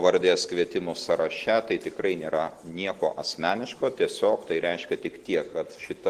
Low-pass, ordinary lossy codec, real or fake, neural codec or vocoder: 14.4 kHz; Opus, 16 kbps; real; none